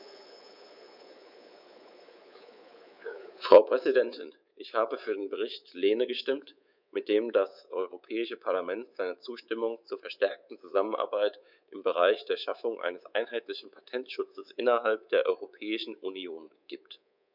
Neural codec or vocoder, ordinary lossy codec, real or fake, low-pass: codec, 24 kHz, 3.1 kbps, DualCodec; none; fake; 5.4 kHz